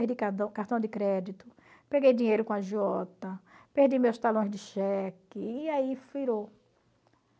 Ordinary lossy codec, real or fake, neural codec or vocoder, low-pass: none; real; none; none